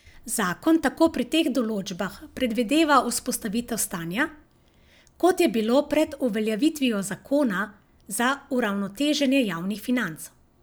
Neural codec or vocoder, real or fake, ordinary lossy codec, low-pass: none; real; none; none